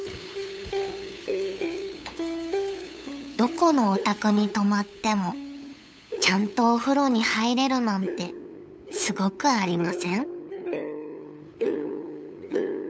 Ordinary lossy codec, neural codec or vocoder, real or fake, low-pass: none; codec, 16 kHz, 8 kbps, FunCodec, trained on LibriTTS, 25 frames a second; fake; none